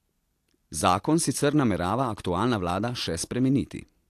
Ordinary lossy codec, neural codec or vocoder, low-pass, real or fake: AAC, 64 kbps; none; 14.4 kHz; real